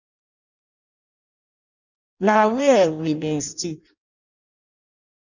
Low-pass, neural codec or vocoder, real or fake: 7.2 kHz; codec, 16 kHz in and 24 kHz out, 0.6 kbps, FireRedTTS-2 codec; fake